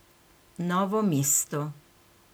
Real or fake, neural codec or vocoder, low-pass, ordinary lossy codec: real; none; none; none